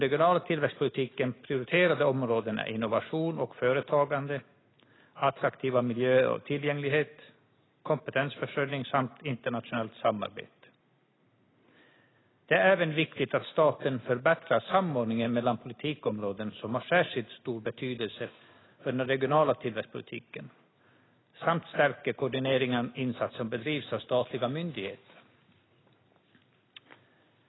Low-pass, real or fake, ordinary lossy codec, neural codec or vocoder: 7.2 kHz; real; AAC, 16 kbps; none